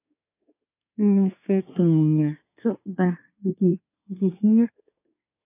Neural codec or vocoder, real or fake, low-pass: codec, 24 kHz, 1 kbps, SNAC; fake; 3.6 kHz